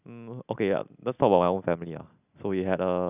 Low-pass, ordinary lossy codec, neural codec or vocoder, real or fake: 3.6 kHz; none; none; real